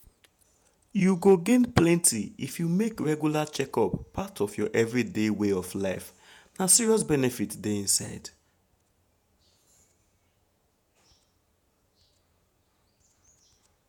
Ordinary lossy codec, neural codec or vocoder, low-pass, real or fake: none; vocoder, 48 kHz, 128 mel bands, Vocos; none; fake